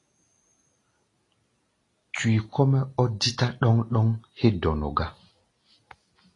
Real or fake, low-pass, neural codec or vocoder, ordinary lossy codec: real; 10.8 kHz; none; AAC, 32 kbps